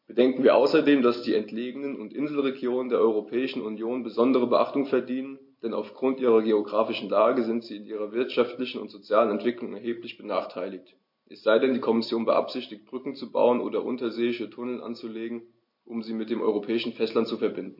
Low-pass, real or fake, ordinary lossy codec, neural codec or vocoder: 5.4 kHz; real; MP3, 32 kbps; none